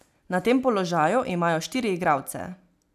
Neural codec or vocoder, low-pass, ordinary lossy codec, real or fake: none; 14.4 kHz; none; real